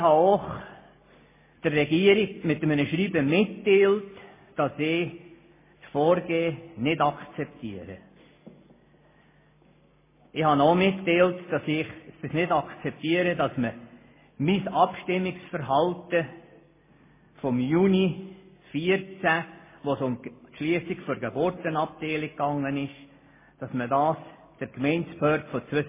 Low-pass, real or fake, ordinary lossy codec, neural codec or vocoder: 3.6 kHz; real; MP3, 16 kbps; none